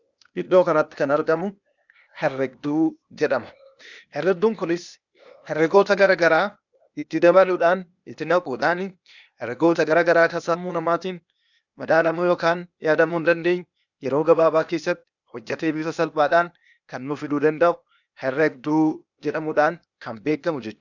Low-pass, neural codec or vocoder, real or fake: 7.2 kHz; codec, 16 kHz, 0.8 kbps, ZipCodec; fake